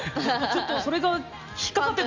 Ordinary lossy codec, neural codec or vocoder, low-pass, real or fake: Opus, 32 kbps; none; 7.2 kHz; real